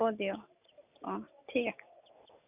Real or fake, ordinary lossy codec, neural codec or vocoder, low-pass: real; none; none; 3.6 kHz